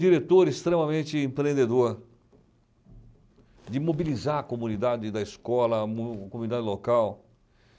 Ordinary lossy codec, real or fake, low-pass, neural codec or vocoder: none; real; none; none